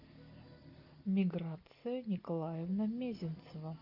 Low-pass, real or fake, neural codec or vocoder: 5.4 kHz; real; none